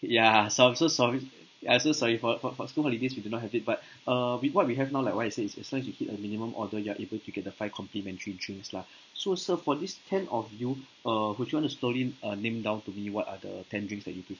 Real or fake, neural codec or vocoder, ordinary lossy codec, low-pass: real; none; none; 7.2 kHz